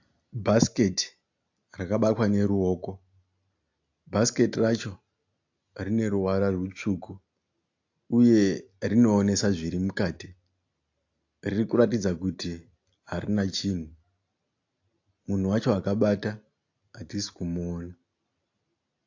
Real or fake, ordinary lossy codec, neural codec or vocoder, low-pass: real; AAC, 48 kbps; none; 7.2 kHz